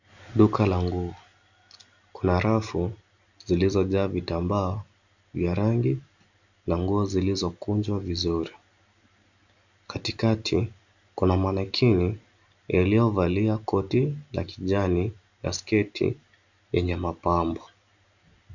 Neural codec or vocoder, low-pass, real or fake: none; 7.2 kHz; real